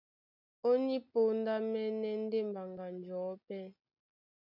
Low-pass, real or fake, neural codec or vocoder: 5.4 kHz; real; none